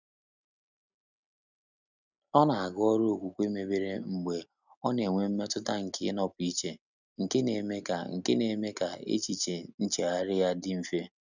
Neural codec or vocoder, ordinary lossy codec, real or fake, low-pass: none; none; real; 7.2 kHz